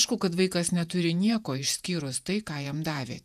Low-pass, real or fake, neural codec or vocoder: 14.4 kHz; fake; vocoder, 48 kHz, 128 mel bands, Vocos